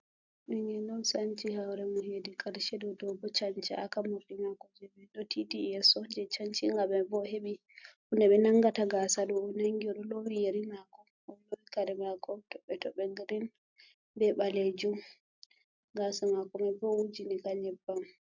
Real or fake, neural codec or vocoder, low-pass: real; none; 7.2 kHz